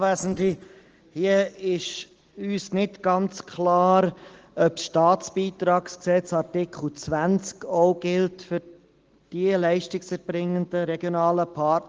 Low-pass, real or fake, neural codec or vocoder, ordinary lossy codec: 7.2 kHz; real; none; Opus, 16 kbps